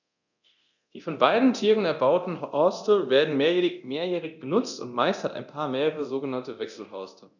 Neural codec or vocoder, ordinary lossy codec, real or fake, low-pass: codec, 24 kHz, 0.9 kbps, DualCodec; none; fake; 7.2 kHz